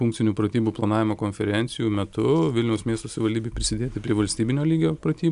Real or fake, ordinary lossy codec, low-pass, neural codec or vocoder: real; AAC, 96 kbps; 10.8 kHz; none